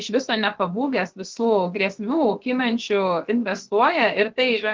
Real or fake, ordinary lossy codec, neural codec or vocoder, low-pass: fake; Opus, 16 kbps; codec, 16 kHz, about 1 kbps, DyCAST, with the encoder's durations; 7.2 kHz